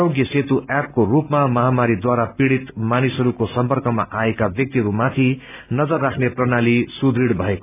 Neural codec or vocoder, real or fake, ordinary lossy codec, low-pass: none; real; none; 3.6 kHz